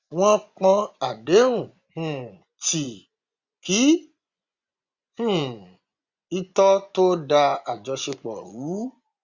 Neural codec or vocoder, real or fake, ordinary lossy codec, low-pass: vocoder, 24 kHz, 100 mel bands, Vocos; fake; Opus, 64 kbps; 7.2 kHz